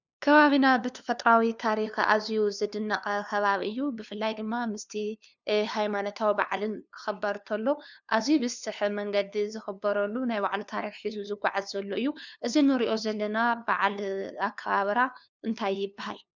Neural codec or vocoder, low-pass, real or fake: codec, 16 kHz, 2 kbps, FunCodec, trained on LibriTTS, 25 frames a second; 7.2 kHz; fake